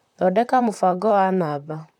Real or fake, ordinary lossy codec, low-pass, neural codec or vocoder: fake; MP3, 96 kbps; 19.8 kHz; vocoder, 44.1 kHz, 128 mel bands, Pupu-Vocoder